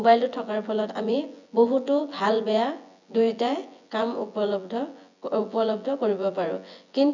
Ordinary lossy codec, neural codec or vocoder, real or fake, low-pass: none; vocoder, 24 kHz, 100 mel bands, Vocos; fake; 7.2 kHz